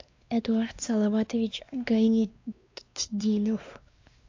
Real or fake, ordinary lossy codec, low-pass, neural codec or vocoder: fake; AAC, 48 kbps; 7.2 kHz; codec, 16 kHz, 2 kbps, X-Codec, WavLM features, trained on Multilingual LibriSpeech